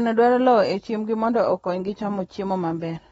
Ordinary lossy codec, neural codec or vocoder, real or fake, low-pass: AAC, 24 kbps; none; real; 14.4 kHz